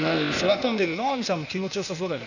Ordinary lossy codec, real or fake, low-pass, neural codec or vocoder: none; fake; 7.2 kHz; codec, 16 kHz, 0.8 kbps, ZipCodec